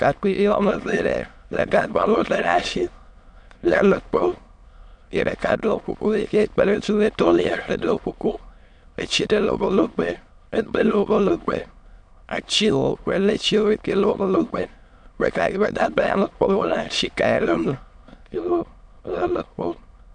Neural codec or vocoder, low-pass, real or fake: autoencoder, 22.05 kHz, a latent of 192 numbers a frame, VITS, trained on many speakers; 9.9 kHz; fake